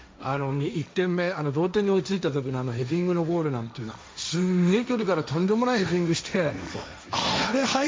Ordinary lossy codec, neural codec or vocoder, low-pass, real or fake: none; codec, 16 kHz, 1.1 kbps, Voila-Tokenizer; none; fake